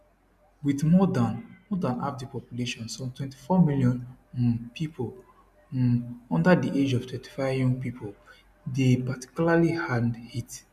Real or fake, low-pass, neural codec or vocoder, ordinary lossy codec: real; 14.4 kHz; none; none